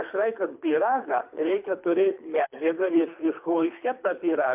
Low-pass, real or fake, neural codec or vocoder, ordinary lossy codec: 3.6 kHz; fake; codec, 24 kHz, 3 kbps, HILCodec; MP3, 32 kbps